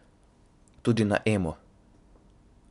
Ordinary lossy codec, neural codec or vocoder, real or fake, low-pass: none; none; real; 10.8 kHz